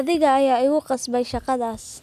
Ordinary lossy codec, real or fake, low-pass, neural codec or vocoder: none; real; 14.4 kHz; none